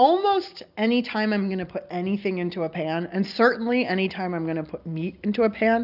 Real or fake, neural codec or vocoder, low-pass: real; none; 5.4 kHz